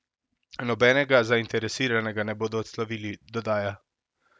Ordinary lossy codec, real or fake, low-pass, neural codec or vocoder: none; real; none; none